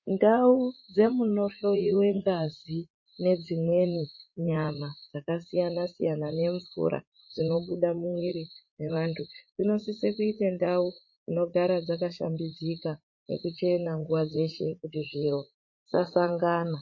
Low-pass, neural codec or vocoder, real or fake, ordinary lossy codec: 7.2 kHz; vocoder, 44.1 kHz, 80 mel bands, Vocos; fake; MP3, 32 kbps